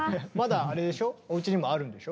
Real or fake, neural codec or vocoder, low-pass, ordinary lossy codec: real; none; none; none